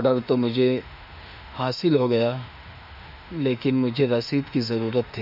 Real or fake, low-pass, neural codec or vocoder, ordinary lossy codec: fake; 5.4 kHz; autoencoder, 48 kHz, 32 numbers a frame, DAC-VAE, trained on Japanese speech; none